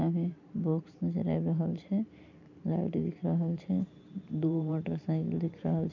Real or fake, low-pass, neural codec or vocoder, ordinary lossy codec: fake; 7.2 kHz; vocoder, 44.1 kHz, 128 mel bands every 512 samples, BigVGAN v2; none